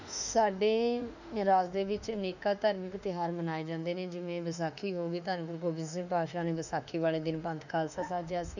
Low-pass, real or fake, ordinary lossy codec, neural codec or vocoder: 7.2 kHz; fake; none; autoencoder, 48 kHz, 32 numbers a frame, DAC-VAE, trained on Japanese speech